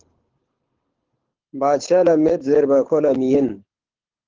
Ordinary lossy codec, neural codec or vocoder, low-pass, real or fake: Opus, 16 kbps; codec, 24 kHz, 6 kbps, HILCodec; 7.2 kHz; fake